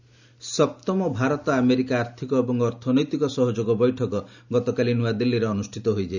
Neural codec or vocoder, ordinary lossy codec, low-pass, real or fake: none; none; 7.2 kHz; real